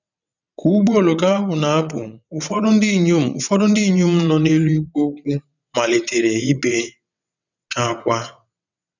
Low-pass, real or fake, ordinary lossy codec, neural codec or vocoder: 7.2 kHz; fake; none; vocoder, 22.05 kHz, 80 mel bands, WaveNeXt